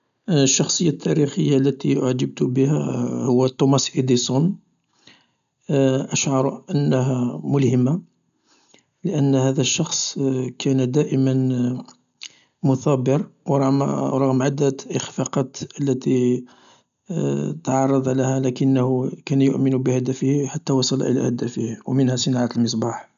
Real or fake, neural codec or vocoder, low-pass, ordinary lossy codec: real; none; 7.2 kHz; none